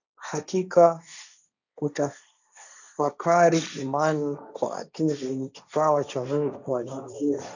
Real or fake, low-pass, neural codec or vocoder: fake; 7.2 kHz; codec, 16 kHz, 1.1 kbps, Voila-Tokenizer